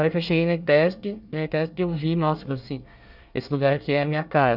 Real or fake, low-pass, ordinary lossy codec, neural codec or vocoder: fake; 5.4 kHz; none; codec, 24 kHz, 1 kbps, SNAC